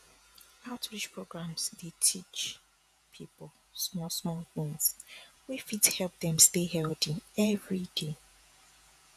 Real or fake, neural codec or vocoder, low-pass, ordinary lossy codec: fake; vocoder, 48 kHz, 128 mel bands, Vocos; 14.4 kHz; none